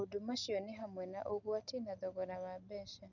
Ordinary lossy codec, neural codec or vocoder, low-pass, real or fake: none; none; 7.2 kHz; real